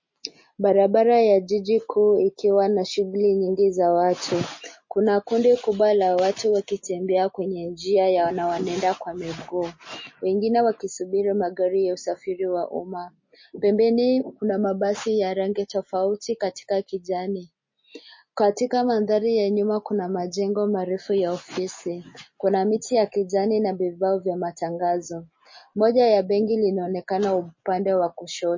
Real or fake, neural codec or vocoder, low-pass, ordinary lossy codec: real; none; 7.2 kHz; MP3, 32 kbps